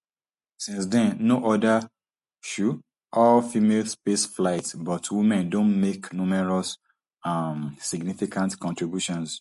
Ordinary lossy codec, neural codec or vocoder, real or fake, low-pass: MP3, 48 kbps; none; real; 14.4 kHz